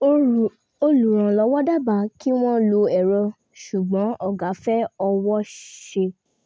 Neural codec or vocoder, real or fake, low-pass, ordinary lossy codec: none; real; none; none